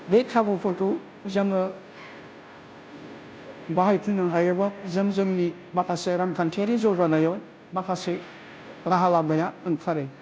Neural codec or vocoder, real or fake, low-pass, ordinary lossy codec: codec, 16 kHz, 0.5 kbps, FunCodec, trained on Chinese and English, 25 frames a second; fake; none; none